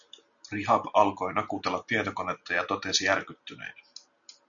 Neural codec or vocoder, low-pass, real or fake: none; 7.2 kHz; real